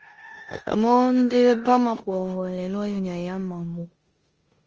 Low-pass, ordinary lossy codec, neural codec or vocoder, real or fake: 7.2 kHz; Opus, 24 kbps; codec, 16 kHz in and 24 kHz out, 0.9 kbps, LongCat-Audio-Codec, four codebook decoder; fake